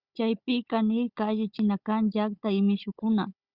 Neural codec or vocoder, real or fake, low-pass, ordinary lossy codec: codec, 16 kHz, 16 kbps, FunCodec, trained on Chinese and English, 50 frames a second; fake; 5.4 kHz; Opus, 64 kbps